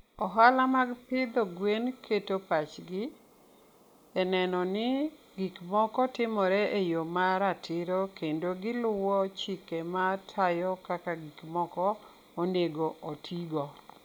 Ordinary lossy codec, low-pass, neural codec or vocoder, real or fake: none; none; none; real